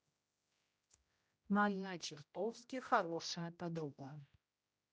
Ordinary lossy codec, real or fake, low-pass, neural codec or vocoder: none; fake; none; codec, 16 kHz, 0.5 kbps, X-Codec, HuBERT features, trained on general audio